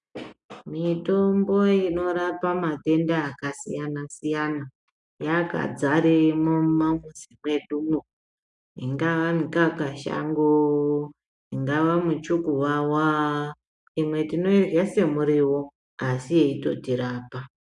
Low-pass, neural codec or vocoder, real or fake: 10.8 kHz; none; real